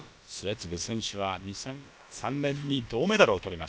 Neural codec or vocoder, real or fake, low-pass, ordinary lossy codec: codec, 16 kHz, about 1 kbps, DyCAST, with the encoder's durations; fake; none; none